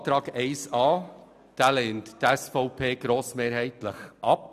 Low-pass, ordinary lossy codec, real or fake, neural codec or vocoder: 14.4 kHz; none; real; none